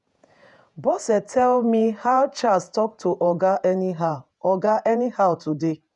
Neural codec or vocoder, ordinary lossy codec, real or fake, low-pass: vocoder, 48 kHz, 128 mel bands, Vocos; none; fake; 10.8 kHz